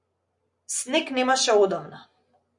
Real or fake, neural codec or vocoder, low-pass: real; none; 10.8 kHz